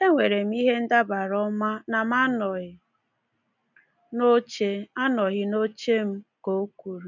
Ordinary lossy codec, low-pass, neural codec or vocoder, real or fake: none; 7.2 kHz; none; real